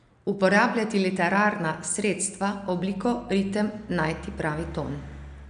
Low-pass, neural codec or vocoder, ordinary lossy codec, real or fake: 9.9 kHz; none; none; real